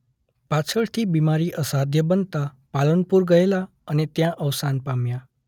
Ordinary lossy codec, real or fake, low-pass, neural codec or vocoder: none; real; 19.8 kHz; none